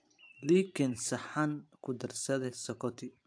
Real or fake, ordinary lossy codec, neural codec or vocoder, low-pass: fake; AAC, 64 kbps; vocoder, 44.1 kHz, 128 mel bands every 512 samples, BigVGAN v2; 9.9 kHz